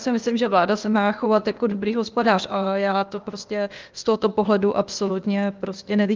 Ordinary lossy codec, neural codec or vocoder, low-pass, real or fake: Opus, 24 kbps; codec, 16 kHz, 0.8 kbps, ZipCodec; 7.2 kHz; fake